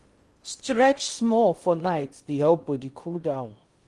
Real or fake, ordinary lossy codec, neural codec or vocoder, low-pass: fake; Opus, 24 kbps; codec, 16 kHz in and 24 kHz out, 0.6 kbps, FocalCodec, streaming, 2048 codes; 10.8 kHz